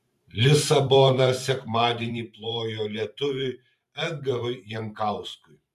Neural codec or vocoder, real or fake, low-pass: vocoder, 44.1 kHz, 128 mel bands every 512 samples, BigVGAN v2; fake; 14.4 kHz